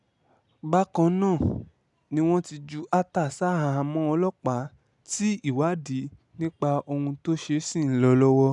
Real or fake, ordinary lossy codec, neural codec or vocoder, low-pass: real; none; none; 10.8 kHz